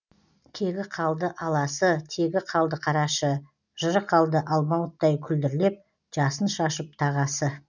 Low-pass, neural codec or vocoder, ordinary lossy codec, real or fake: 7.2 kHz; none; none; real